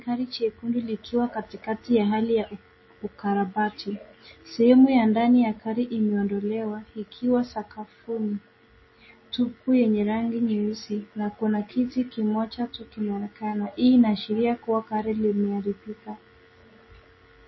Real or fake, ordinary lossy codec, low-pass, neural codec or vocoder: real; MP3, 24 kbps; 7.2 kHz; none